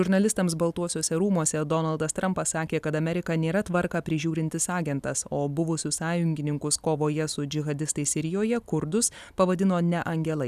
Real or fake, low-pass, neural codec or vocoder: real; 14.4 kHz; none